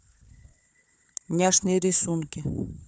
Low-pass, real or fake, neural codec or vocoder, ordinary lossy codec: none; fake; codec, 16 kHz, 4 kbps, FunCodec, trained on Chinese and English, 50 frames a second; none